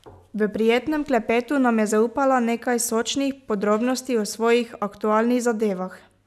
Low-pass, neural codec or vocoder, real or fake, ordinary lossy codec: 14.4 kHz; none; real; none